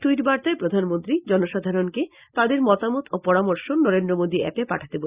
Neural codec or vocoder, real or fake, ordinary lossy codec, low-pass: none; real; Opus, 64 kbps; 3.6 kHz